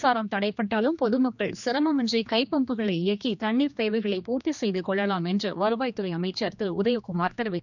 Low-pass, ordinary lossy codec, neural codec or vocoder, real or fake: 7.2 kHz; none; codec, 16 kHz, 2 kbps, X-Codec, HuBERT features, trained on general audio; fake